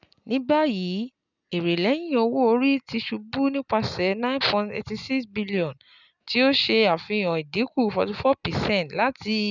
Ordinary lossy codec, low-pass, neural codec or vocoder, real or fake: none; 7.2 kHz; none; real